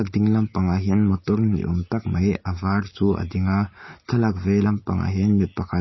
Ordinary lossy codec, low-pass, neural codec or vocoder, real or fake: MP3, 24 kbps; 7.2 kHz; none; real